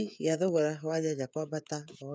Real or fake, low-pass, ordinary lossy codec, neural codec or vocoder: fake; none; none; codec, 16 kHz, 16 kbps, FreqCodec, smaller model